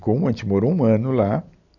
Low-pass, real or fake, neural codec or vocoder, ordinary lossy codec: 7.2 kHz; real; none; none